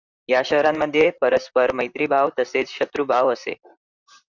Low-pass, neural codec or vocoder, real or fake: 7.2 kHz; vocoder, 44.1 kHz, 128 mel bands, Pupu-Vocoder; fake